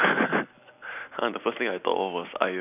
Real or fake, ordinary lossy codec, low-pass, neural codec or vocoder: real; none; 3.6 kHz; none